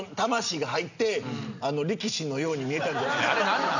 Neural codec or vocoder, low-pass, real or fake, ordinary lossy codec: none; 7.2 kHz; real; none